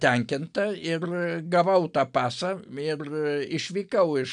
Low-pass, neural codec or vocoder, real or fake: 9.9 kHz; none; real